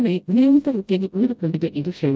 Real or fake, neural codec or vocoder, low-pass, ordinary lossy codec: fake; codec, 16 kHz, 0.5 kbps, FreqCodec, smaller model; none; none